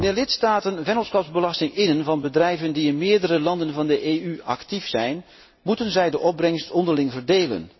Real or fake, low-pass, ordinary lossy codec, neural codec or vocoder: real; 7.2 kHz; MP3, 24 kbps; none